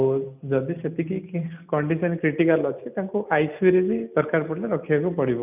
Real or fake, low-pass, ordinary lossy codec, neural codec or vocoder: real; 3.6 kHz; none; none